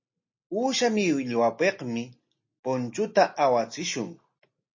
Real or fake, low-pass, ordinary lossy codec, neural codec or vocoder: real; 7.2 kHz; MP3, 32 kbps; none